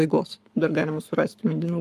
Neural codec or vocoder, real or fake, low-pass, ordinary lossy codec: codec, 44.1 kHz, 7.8 kbps, Pupu-Codec; fake; 14.4 kHz; Opus, 32 kbps